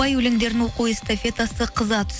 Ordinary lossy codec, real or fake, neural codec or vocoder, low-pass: none; real; none; none